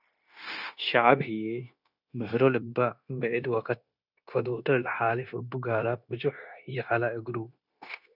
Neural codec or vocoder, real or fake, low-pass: codec, 16 kHz, 0.9 kbps, LongCat-Audio-Codec; fake; 5.4 kHz